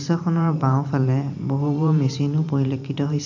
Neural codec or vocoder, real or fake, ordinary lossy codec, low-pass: vocoder, 44.1 kHz, 128 mel bands every 512 samples, BigVGAN v2; fake; none; 7.2 kHz